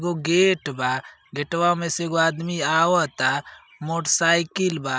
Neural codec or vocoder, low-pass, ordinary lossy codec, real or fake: none; none; none; real